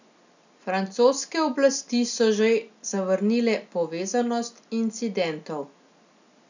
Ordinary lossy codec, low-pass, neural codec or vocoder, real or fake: none; 7.2 kHz; none; real